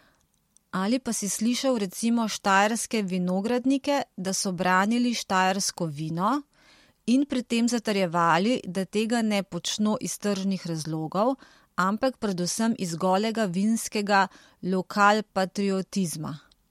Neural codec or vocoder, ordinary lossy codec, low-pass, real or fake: none; MP3, 64 kbps; 19.8 kHz; real